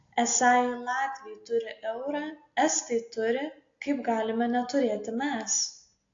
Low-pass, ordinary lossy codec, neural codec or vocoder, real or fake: 7.2 kHz; AAC, 48 kbps; none; real